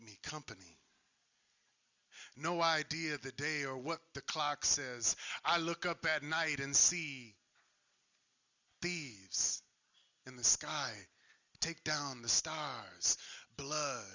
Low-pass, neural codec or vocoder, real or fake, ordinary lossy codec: 7.2 kHz; none; real; Opus, 64 kbps